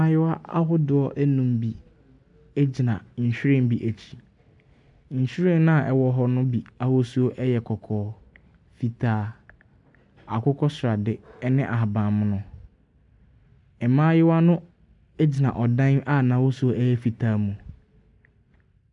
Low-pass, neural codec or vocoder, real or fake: 10.8 kHz; none; real